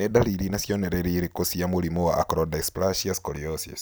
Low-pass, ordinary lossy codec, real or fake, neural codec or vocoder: none; none; real; none